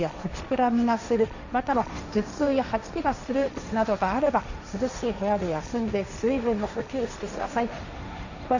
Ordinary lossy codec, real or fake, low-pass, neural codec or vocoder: none; fake; 7.2 kHz; codec, 16 kHz, 1.1 kbps, Voila-Tokenizer